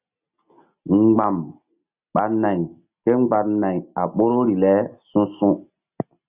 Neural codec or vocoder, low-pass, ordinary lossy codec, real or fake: none; 3.6 kHz; Opus, 64 kbps; real